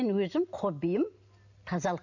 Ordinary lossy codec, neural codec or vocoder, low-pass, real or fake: none; none; 7.2 kHz; real